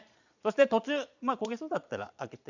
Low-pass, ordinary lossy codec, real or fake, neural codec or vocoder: 7.2 kHz; none; fake; vocoder, 22.05 kHz, 80 mel bands, Vocos